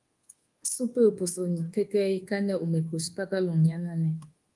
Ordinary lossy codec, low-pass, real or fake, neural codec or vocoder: Opus, 24 kbps; 10.8 kHz; fake; codec, 24 kHz, 1.2 kbps, DualCodec